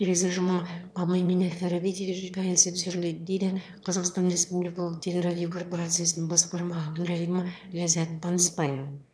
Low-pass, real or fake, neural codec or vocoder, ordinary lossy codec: none; fake; autoencoder, 22.05 kHz, a latent of 192 numbers a frame, VITS, trained on one speaker; none